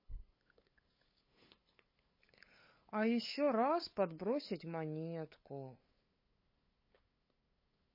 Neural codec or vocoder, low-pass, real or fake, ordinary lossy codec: codec, 16 kHz, 8 kbps, FunCodec, trained on LibriTTS, 25 frames a second; 5.4 kHz; fake; MP3, 24 kbps